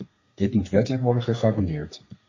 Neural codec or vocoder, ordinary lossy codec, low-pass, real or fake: codec, 32 kHz, 1.9 kbps, SNAC; MP3, 32 kbps; 7.2 kHz; fake